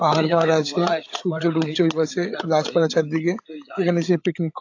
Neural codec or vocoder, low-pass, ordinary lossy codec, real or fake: codec, 16 kHz, 8 kbps, FreqCodec, larger model; 7.2 kHz; AAC, 48 kbps; fake